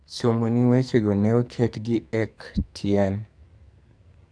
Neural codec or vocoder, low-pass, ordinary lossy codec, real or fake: codec, 44.1 kHz, 2.6 kbps, SNAC; 9.9 kHz; none; fake